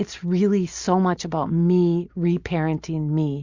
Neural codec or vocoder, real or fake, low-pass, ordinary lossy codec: none; real; 7.2 kHz; Opus, 64 kbps